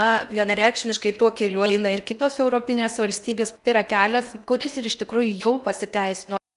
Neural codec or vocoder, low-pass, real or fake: codec, 16 kHz in and 24 kHz out, 0.8 kbps, FocalCodec, streaming, 65536 codes; 10.8 kHz; fake